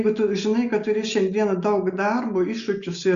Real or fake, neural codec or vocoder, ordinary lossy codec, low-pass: real; none; Opus, 64 kbps; 7.2 kHz